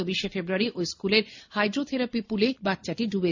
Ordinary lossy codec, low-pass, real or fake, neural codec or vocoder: MP3, 48 kbps; 7.2 kHz; real; none